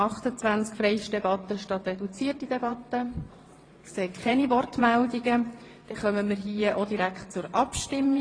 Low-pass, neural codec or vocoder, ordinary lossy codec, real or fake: 9.9 kHz; vocoder, 22.05 kHz, 80 mel bands, WaveNeXt; AAC, 32 kbps; fake